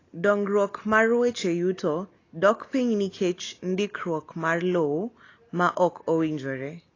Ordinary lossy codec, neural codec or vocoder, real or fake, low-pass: AAC, 32 kbps; none; real; 7.2 kHz